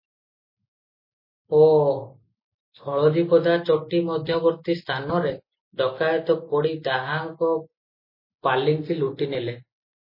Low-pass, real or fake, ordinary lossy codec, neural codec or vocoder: 5.4 kHz; real; MP3, 24 kbps; none